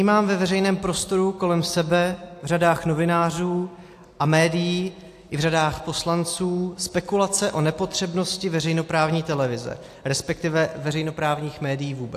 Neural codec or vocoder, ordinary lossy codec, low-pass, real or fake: none; AAC, 64 kbps; 14.4 kHz; real